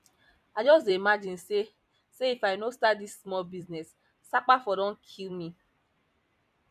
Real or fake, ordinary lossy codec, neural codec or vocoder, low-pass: fake; none; vocoder, 44.1 kHz, 128 mel bands every 256 samples, BigVGAN v2; 14.4 kHz